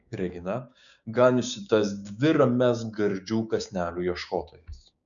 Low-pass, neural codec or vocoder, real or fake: 7.2 kHz; codec, 16 kHz, 6 kbps, DAC; fake